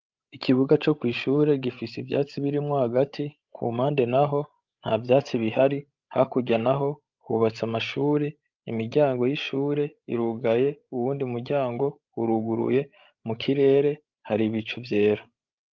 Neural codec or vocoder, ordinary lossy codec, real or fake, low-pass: codec, 16 kHz, 16 kbps, FreqCodec, larger model; Opus, 24 kbps; fake; 7.2 kHz